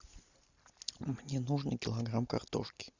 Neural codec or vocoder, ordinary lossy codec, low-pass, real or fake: none; Opus, 64 kbps; 7.2 kHz; real